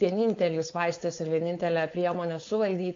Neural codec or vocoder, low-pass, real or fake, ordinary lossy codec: codec, 16 kHz, 4.8 kbps, FACodec; 7.2 kHz; fake; AAC, 48 kbps